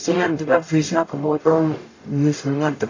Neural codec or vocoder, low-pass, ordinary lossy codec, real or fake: codec, 44.1 kHz, 0.9 kbps, DAC; 7.2 kHz; AAC, 32 kbps; fake